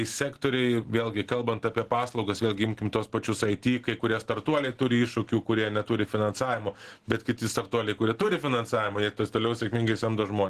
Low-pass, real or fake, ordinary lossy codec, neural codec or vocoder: 14.4 kHz; real; Opus, 16 kbps; none